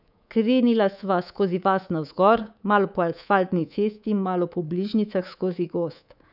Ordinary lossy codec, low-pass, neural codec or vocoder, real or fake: none; 5.4 kHz; codec, 24 kHz, 3.1 kbps, DualCodec; fake